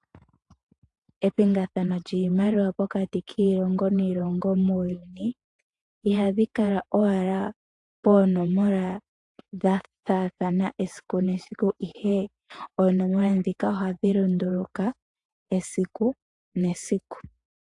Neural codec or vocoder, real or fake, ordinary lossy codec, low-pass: vocoder, 48 kHz, 128 mel bands, Vocos; fake; AAC, 64 kbps; 10.8 kHz